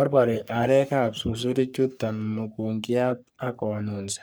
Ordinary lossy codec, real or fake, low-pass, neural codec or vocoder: none; fake; none; codec, 44.1 kHz, 3.4 kbps, Pupu-Codec